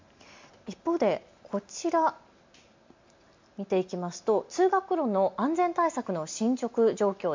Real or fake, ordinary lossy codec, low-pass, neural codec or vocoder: real; MP3, 64 kbps; 7.2 kHz; none